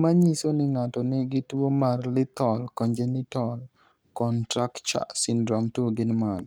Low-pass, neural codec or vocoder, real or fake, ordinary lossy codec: none; codec, 44.1 kHz, 7.8 kbps, Pupu-Codec; fake; none